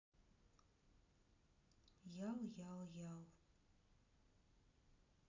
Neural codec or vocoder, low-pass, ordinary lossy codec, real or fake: none; 7.2 kHz; AAC, 48 kbps; real